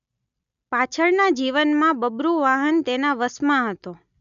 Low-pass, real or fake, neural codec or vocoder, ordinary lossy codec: 7.2 kHz; real; none; none